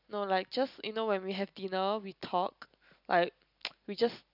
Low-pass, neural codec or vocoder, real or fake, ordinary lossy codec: 5.4 kHz; none; real; none